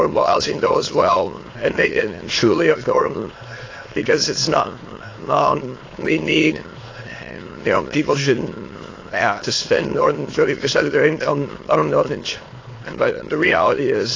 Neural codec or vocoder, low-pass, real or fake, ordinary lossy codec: autoencoder, 22.05 kHz, a latent of 192 numbers a frame, VITS, trained on many speakers; 7.2 kHz; fake; AAC, 32 kbps